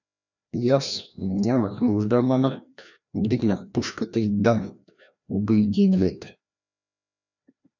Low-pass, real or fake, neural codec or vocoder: 7.2 kHz; fake; codec, 16 kHz, 1 kbps, FreqCodec, larger model